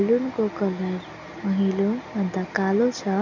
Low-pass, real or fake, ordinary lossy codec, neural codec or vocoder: 7.2 kHz; real; none; none